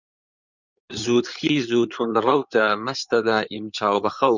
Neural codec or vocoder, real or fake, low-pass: codec, 16 kHz in and 24 kHz out, 2.2 kbps, FireRedTTS-2 codec; fake; 7.2 kHz